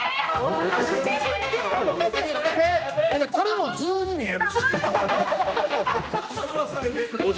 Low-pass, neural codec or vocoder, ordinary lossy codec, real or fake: none; codec, 16 kHz, 1 kbps, X-Codec, HuBERT features, trained on general audio; none; fake